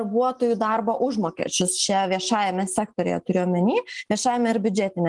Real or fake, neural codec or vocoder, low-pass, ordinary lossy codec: real; none; 10.8 kHz; Opus, 24 kbps